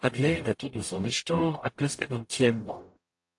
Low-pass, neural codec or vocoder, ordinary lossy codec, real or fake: 10.8 kHz; codec, 44.1 kHz, 0.9 kbps, DAC; AAC, 48 kbps; fake